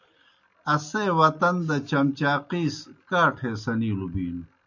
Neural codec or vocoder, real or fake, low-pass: none; real; 7.2 kHz